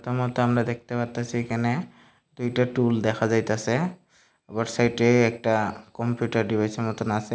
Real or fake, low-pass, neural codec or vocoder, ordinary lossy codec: real; none; none; none